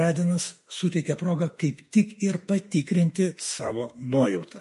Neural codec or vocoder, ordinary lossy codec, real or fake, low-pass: autoencoder, 48 kHz, 32 numbers a frame, DAC-VAE, trained on Japanese speech; MP3, 48 kbps; fake; 14.4 kHz